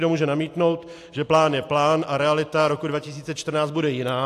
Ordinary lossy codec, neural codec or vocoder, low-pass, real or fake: AAC, 64 kbps; none; 14.4 kHz; real